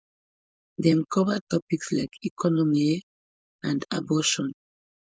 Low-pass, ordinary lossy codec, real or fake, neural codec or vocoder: none; none; fake; codec, 16 kHz, 4.8 kbps, FACodec